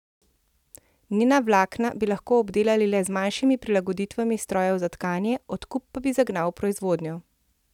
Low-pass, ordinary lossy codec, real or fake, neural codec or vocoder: 19.8 kHz; none; real; none